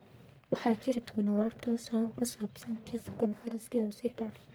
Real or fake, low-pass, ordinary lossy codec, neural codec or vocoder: fake; none; none; codec, 44.1 kHz, 1.7 kbps, Pupu-Codec